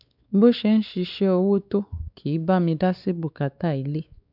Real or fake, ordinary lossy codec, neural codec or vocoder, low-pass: fake; none; codec, 16 kHz, 4 kbps, X-Codec, WavLM features, trained on Multilingual LibriSpeech; 5.4 kHz